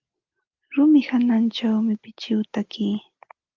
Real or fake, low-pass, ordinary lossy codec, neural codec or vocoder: real; 7.2 kHz; Opus, 32 kbps; none